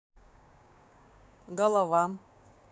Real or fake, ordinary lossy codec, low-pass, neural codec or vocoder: fake; none; none; codec, 16 kHz, 6 kbps, DAC